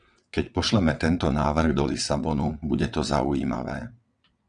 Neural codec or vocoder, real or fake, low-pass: vocoder, 22.05 kHz, 80 mel bands, WaveNeXt; fake; 9.9 kHz